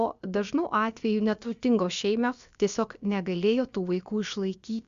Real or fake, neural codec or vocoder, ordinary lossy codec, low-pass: fake; codec, 16 kHz, about 1 kbps, DyCAST, with the encoder's durations; Opus, 64 kbps; 7.2 kHz